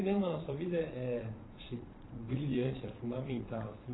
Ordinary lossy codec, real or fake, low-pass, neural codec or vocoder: AAC, 16 kbps; fake; 7.2 kHz; vocoder, 22.05 kHz, 80 mel bands, Vocos